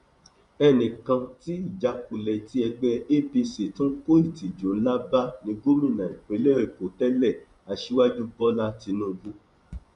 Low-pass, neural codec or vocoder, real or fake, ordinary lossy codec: 10.8 kHz; vocoder, 24 kHz, 100 mel bands, Vocos; fake; none